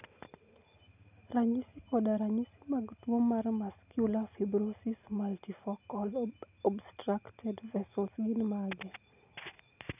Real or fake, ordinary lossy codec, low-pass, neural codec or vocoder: real; none; 3.6 kHz; none